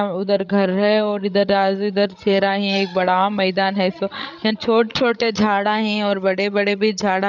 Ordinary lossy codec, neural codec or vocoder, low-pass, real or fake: none; codec, 16 kHz, 16 kbps, FreqCodec, larger model; 7.2 kHz; fake